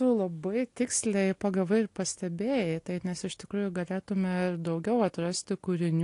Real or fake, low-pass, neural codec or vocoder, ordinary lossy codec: real; 10.8 kHz; none; AAC, 48 kbps